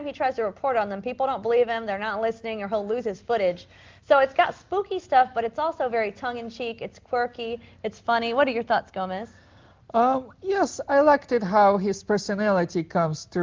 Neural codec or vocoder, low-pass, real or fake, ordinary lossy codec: none; 7.2 kHz; real; Opus, 32 kbps